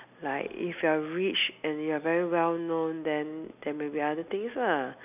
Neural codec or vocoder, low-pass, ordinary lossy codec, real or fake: none; 3.6 kHz; none; real